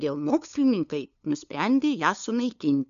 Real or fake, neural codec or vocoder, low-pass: fake; codec, 16 kHz, 2 kbps, FunCodec, trained on LibriTTS, 25 frames a second; 7.2 kHz